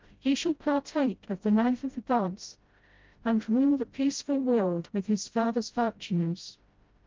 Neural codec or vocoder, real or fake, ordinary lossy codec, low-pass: codec, 16 kHz, 0.5 kbps, FreqCodec, smaller model; fake; Opus, 32 kbps; 7.2 kHz